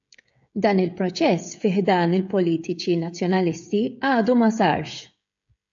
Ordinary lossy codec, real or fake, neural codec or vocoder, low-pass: AAC, 64 kbps; fake; codec, 16 kHz, 16 kbps, FreqCodec, smaller model; 7.2 kHz